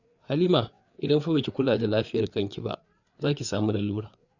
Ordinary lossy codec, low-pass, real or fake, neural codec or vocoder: none; 7.2 kHz; fake; codec, 16 kHz, 4 kbps, FreqCodec, larger model